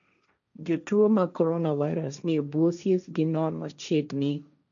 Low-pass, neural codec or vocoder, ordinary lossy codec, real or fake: 7.2 kHz; codec, 16 kHz, 1.1 kbps, Voila-Tokenizer; none; fake